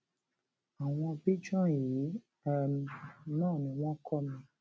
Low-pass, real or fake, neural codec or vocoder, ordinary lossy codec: none; real; none; none